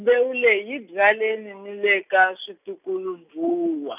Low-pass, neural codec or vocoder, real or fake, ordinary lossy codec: 3.6 kHz; none; real; none